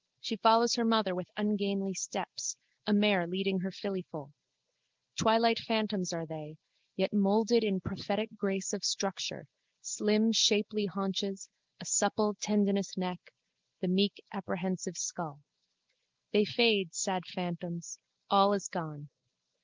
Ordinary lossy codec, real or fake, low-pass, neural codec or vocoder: Opus, 32 kbps; real; 7.2 kHz; none